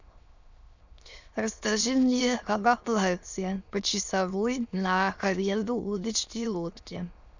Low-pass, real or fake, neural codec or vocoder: 7.2 kHz; fake; autoencoder, 22.05 kHz, a latent of 192 numbers a frame, VITS, trained on many speakers